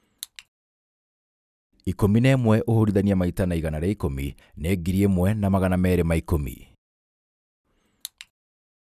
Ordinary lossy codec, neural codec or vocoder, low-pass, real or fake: none; none; 14.4 kHz; real